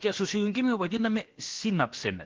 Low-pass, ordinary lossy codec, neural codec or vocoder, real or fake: 7.2 kHz; Opus, 16 kbps; codec, 16 kHz, about 1 kbps, DyCAST, with the encoder's durations; fake